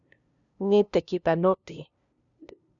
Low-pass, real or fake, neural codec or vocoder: 7.2 kHz; fake; codec, 16 kHz, 0.5 kbps, FunCodec, trained on LibriTTS, 25 frames a second